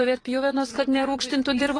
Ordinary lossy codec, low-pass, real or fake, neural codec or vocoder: AAC, 32 kbps; 9.9 kHz; fake; vocoder, 22.05 kHz, 80 mel bands, Vocos